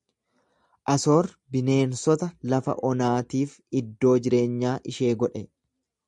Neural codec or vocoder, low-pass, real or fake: none; 10.8 kHz; real